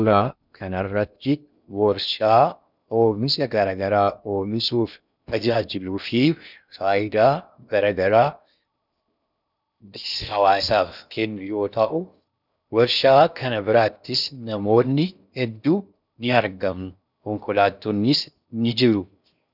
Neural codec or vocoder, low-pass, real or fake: codec, 16 kHz in and 24 kHz out, 0.6 kbps, FocalCodec, streaming, 2048 codes; 5.4 kHz; fake